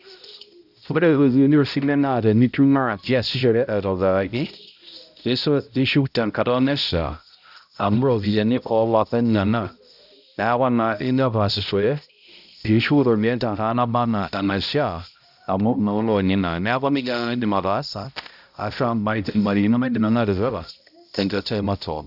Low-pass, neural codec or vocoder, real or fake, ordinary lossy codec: 5.4 kHz; codec, 16 kHz, 0.5 kbps, X-Codec, HuBERT features, trained on balanced general audio; fake; none